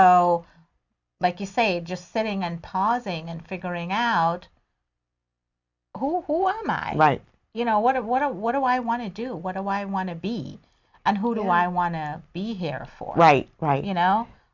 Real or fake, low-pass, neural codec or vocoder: real; 7.2 kHz; none